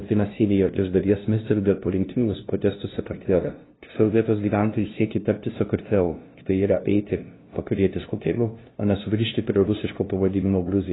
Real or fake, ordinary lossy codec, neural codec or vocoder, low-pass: fake; AAC, 16 kbps; codec, 16 kHz, 0.5 kbps, FunCodec, trained on LibriTTS, 25 frames a second; 7.2 kHz